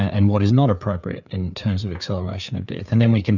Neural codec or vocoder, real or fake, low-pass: codec, 16 kHz, 4 kbps, FreqCodec, larger model; fake; 7.2 kHz